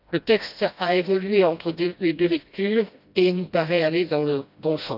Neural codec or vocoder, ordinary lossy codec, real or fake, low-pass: codec, 16 kHz, 1 kbps, FreqCodec, smaller model; AAC, 48 kbps; fake; 5.4 kHz